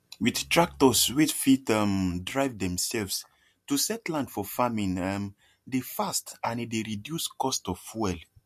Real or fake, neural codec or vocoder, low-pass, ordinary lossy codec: real; none; 14.4 kHz; MP3, 64 kbps